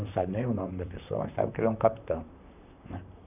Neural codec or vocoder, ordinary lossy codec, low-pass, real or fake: vocoder, 44.1 kHz, 128 mel bands, Pupu-Vocoder; none; 3.6 kHz; fake